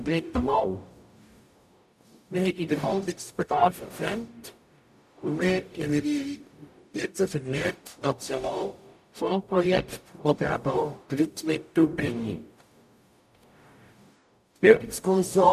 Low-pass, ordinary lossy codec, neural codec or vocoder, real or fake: 14.4 kHz; none; codec, 44.1 kHz, 0.9 kbps, DAC; fake